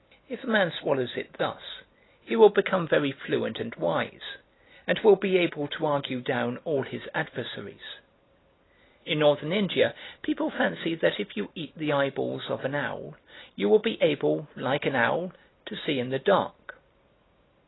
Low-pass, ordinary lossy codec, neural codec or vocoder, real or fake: 7.2 kHz; AAC, 16 kbps; none; real